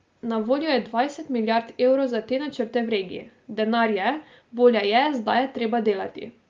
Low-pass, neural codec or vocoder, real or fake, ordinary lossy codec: 7.2 kHz; none; real; Opus, 32 kbps